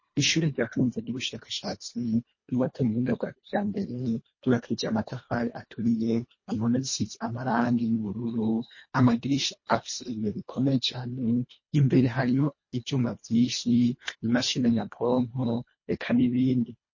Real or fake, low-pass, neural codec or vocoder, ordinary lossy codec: fake; 7.2 kHz; codec, 24 kHz, 1.5 kbps, HILCodec; MP3, 32 kbps